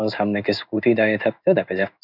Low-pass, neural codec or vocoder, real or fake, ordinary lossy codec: 5.4 kHz; codec, 16 kHz in and 24 kHz out, 1 kbps, XY-Tokenizer; fake; none